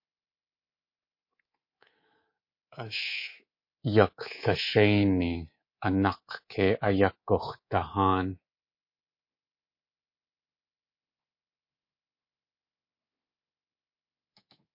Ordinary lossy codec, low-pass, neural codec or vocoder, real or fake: MP3, 32 kbps; 5.4 kHz; codec, 24 kHz, 3.1 kbps, DualCodec; fake